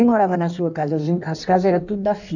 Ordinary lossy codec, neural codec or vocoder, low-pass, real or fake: none; codec, 16 kHz in and 24 kHz out, 1.1 kbps, FireRedTTS-2 codec; 7.2 kHz; fake